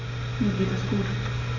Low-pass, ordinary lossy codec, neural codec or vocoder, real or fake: 7.2 kHz; none; none; real